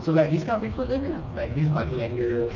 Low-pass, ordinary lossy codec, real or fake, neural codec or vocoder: 7.2 kHz; none; fake; codec, 16 kHz, 2 kbps, FreqCodec, smaller model